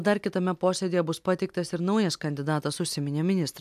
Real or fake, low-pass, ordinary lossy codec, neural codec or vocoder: real; 14.4 kHz; AAC, 96 kbps; none